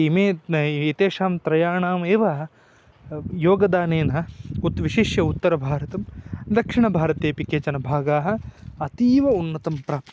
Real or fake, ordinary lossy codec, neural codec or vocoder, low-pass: real; none; none; none